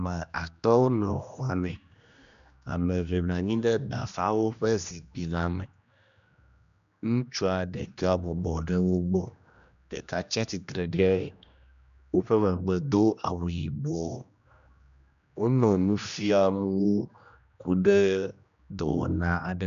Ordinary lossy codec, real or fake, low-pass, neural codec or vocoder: MP3, 96 kbps; fake; 7.2 kHz; codec, 16 kHz, 1 kbps, X-Codec, HuBERT features, trained on general audio